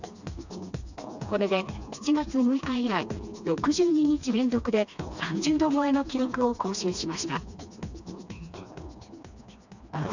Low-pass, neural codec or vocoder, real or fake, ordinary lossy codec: 7.2 kHz; codec, 16 kHz, 2 kbps, FreqCodec, smaller model; fake; none